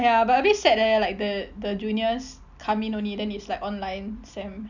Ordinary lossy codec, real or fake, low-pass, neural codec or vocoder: none; real; 7.2 kHz; none